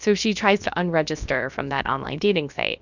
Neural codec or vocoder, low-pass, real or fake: codec, 16 kHz, about 1 kbps, DyCAST, with the encoder's durations; 7.2 kHz; fake